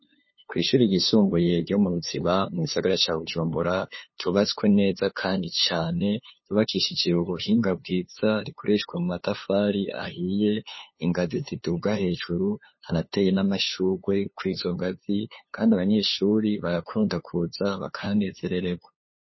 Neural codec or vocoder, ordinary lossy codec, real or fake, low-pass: codec, 16 kHz, 2 kbps, FunCodec, trained on LibriTTS, 25 frames a second; MP3, 24 kbps; fake; 7.2 kHz